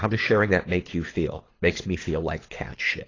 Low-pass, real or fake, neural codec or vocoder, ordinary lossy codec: 7.2 kHz; fake; codec, 24 kHz, 6 kbps, HILCodec; AAC, 32 kbps